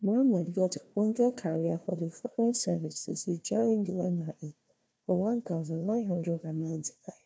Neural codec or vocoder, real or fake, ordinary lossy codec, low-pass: codec, 16 kHz, 1 kbps, FunCodec, trained on Chinese and English, 50 frames a second; fake; none; none